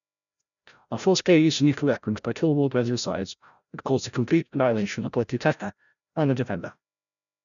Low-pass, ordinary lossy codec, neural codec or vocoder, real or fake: 7.2 kHz; none; codec, 16 kHz, 0.5 kbps, FreqCodec, larger model; fake